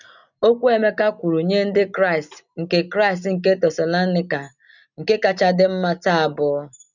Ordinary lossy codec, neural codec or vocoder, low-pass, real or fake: none; none; 7.2 kHz; real